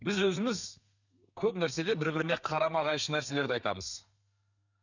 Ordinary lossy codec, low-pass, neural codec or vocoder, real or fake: none; 7.2 kHz; codec, 32 kHz, 1.9 kbps, SNAC; fake